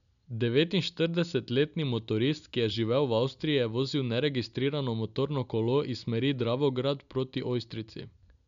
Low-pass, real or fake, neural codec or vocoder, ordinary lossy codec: 7.2 kHz; real; none; none